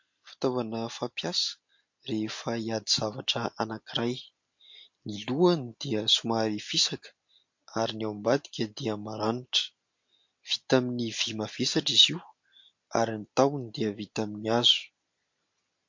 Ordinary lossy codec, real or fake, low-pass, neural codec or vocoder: MP3, 48 kbps; real; 7.2 kHz; none